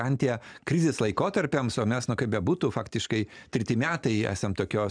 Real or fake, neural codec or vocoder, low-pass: real; none; 9.9 kHz